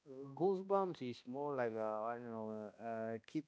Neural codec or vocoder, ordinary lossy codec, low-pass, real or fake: codec, 16 kHz, 1 kbps, X-Codec, HuBERT features, trained on balanced general audio; none; none; fake